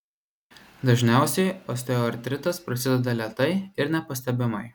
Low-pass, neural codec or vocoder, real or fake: 19.8 kHz; none; real